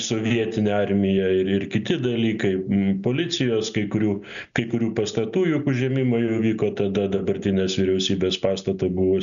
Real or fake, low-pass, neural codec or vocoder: real; 7.2 kHz; none